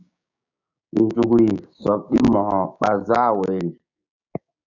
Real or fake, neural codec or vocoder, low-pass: fake; codec, 16 kHz, 6 kbps, DAC; 7.2 kHz